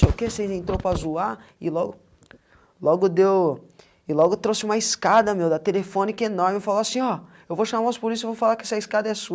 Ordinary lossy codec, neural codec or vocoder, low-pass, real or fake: none; none; none; real